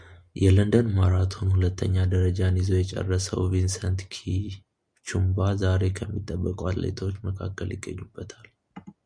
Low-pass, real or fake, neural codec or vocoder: 9.9 kHz; real; none